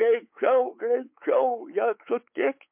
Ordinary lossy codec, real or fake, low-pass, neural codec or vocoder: MP3, 32 kbps; fake; 3.6 kHz; codec, 16 kHz, 4 kbps, X-Codec, WavLM features, trained on Multilingual LibriSpeech